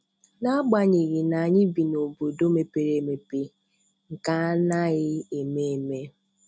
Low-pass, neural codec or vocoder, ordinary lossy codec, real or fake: none; none; none; real